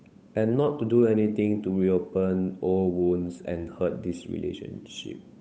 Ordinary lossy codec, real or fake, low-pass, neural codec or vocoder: none; fake; none; codec, 16 kHz, 8 kbps, FunCodec, trained on Chinese and English, 25 frames a second